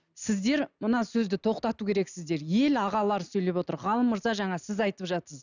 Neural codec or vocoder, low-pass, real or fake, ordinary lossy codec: none; 7.2 kHz; real; none